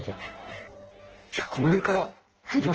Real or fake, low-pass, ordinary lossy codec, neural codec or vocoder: fake; 7.2 kHz; Opus, 16 kbps; codec, 16 kHz in and 24 kHz out, 0.6 kbps, FireRedTTS-2 codec